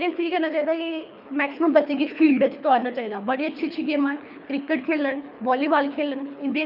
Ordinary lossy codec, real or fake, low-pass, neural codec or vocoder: none; fake; 5.4 kHz; codec, 24 kHz, 3 kbps, HILCodec